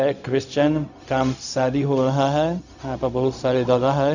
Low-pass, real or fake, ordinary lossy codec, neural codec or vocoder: 7.2 kHz; fake; none; codec, 16 kHz, 0.4 kbps, LongCat-Audio-Codec